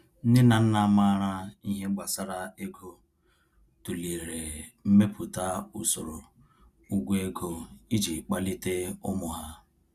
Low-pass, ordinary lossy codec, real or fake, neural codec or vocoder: 14.4 kHz; Opus, 64 kbps; real; none